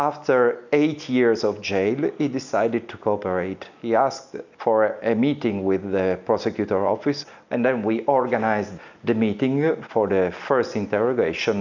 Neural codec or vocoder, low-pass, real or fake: none; 7.2 kHz; real